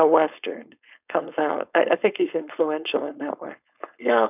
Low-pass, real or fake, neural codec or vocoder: 3.6 kHz; fake; codec, 16 kHz, 4.8 kbps, FACodec